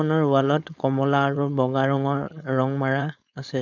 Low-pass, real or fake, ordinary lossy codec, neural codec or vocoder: 7.2 kHz; fake; none; codec, 16 kHz, 4.8 kbps, FACodec